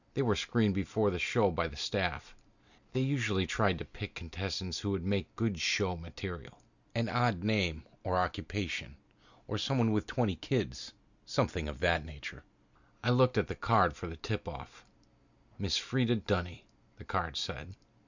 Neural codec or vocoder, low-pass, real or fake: none; 7.2 kHz; real